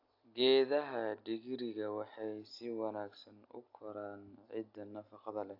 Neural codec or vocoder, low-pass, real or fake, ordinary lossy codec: none; 5.4 kHz; real; AAC, 48 kbps